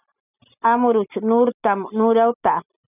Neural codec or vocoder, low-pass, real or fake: none; 3.6 kHz; real